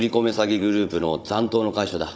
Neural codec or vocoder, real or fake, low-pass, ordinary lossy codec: codec, 16 kHz, 16 kbps, FunCodec, trained on Chinese and English, 50 frames a second; fake; none; none